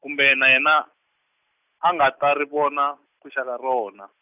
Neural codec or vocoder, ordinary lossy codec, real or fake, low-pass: none; none; real; 3.6 kHz